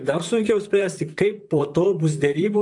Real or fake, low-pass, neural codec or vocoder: fake; 10.8 kHz; vocoder, 44.1 kHz, 128 mel bands, Pupu-Vocoder